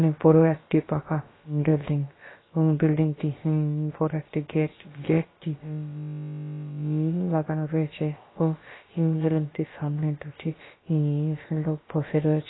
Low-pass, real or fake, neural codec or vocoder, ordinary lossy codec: 7.2 kHz; fake; codec, 16 kHz, about 1 kbps, DyCAST, with the encoder's durations; AAC, 16 kbps